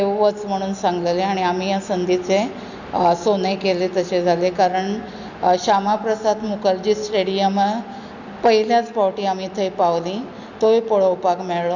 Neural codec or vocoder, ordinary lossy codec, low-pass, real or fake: none; none; 7.2 kHz; real